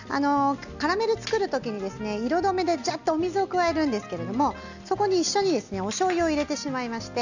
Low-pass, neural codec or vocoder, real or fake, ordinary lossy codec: 7.2 kHz; none; real; none